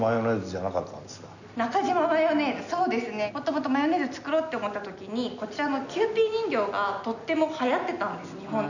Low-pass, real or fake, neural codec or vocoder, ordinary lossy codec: 7.2 kHz; real; none; none